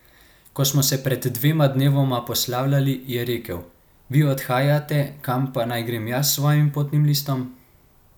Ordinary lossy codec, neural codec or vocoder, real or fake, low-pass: none; none; real; none